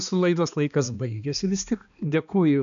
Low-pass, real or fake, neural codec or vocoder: 7.2 kHz; fake; codec, 16 kHz, 2 kbps, X-Codec, HuBERT features, trained on balanced general audio